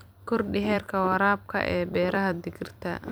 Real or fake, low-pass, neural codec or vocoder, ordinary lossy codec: fake; none; vocoder, 44.1 kHz, 128 mel bands every 256 samples, BigVGAN v2; none